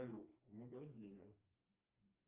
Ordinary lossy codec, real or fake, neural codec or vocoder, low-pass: Opus, 24 kbps; fake; codec, 16 kHz, 2 kbps, FreqCodec, smaller model; 3.6 kHz